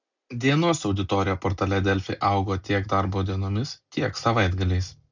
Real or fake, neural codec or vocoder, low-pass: real; none; 7.2 kHz